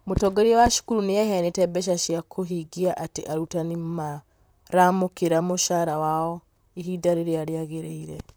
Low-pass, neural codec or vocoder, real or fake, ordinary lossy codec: none; vocoder, 44.1 kHz, 128 mel bands, Pupu-Vocoder; fake; none